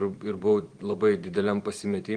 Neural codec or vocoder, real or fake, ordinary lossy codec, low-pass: vocoder, 44.1 kHz, 128 mel bands every 512 samples, BigVGAN v2; fake; Opus, 24 kbps; 9.9 kHz